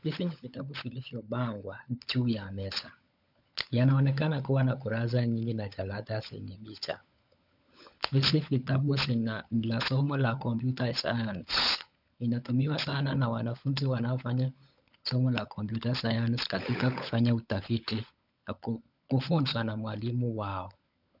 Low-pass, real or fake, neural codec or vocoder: 5.4 kHz; fake; codec, 16 kHz, 16 kbps, FunCodec, trained on LibriTTS, 50 frames a second